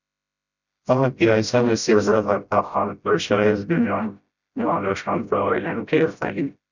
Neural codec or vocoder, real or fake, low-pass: codec, 16 kHz, 0.5 kbps, FreqCodec, smaller model; fake; 7.2 kHz